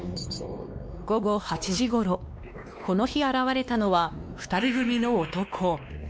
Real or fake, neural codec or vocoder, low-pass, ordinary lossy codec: fake; codec, 16 kHz, 2 kbps, X-Codec, WavLM features, trained on Multilingual LibriSpeech; none; none